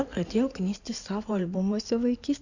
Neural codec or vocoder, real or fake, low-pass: codec, 16 kHz in and 24 kHz out, 2.2 kbps, FireRedTTS-2 codec; fake; 7.2 kHz